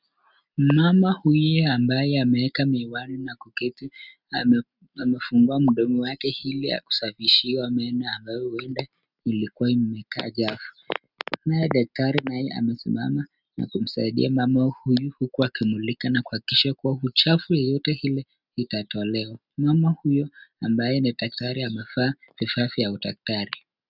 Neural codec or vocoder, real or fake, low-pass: none; real; 5.4 kHz